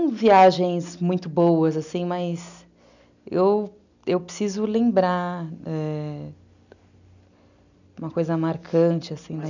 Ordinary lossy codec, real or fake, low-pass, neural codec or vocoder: none; real; 7.2 kHz; none